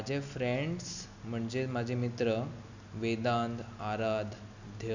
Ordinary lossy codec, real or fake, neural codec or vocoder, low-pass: none; real; none; 7.2 kHz